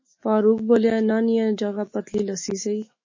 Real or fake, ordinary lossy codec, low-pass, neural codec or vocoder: fake; MP3, 32 kbps; 7.2 kHz; autoencoder, 48 kHz, 128 numbers a frame, DAC-VAE, trained on Japanese speech